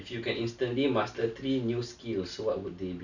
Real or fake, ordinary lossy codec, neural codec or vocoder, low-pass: real; none; none; 7.2 kHz